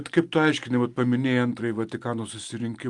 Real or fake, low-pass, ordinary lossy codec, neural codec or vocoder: real; 10.8 kHz; Opus, 32 kbps; none